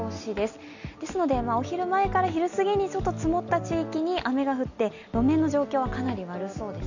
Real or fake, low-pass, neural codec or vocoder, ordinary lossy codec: real; 7.2 kHz; none; none